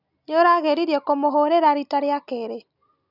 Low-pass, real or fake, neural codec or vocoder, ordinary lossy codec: 5.4 kHz; real; none; none